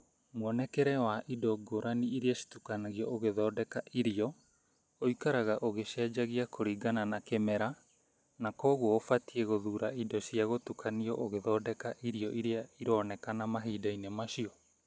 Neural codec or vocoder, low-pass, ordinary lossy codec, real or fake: none; none; none; real